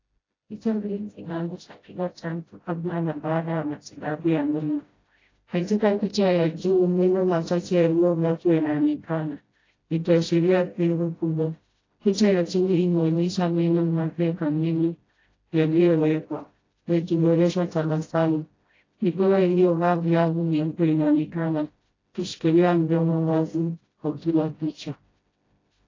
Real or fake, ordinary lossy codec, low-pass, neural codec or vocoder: fake; AAC, 32 kbps; 7.2 kHz; codec, 16 kHz, 0.5 kbps, FreqCodec, smaller model